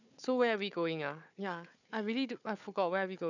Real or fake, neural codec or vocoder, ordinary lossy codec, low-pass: fake; codec, 16 kHz, 4 kbps, FunCodec, trained on Chinese and English, 50 frames a second; none; 7.2 kHz